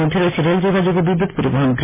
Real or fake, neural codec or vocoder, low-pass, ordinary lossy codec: real; none; 3.6 kHz; MP3, 16 kbps